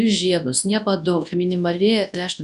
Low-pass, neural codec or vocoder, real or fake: 10.8 kHz; codec, 24 kHz, 0.9 kbps, WavTokenizer, large speech release; fake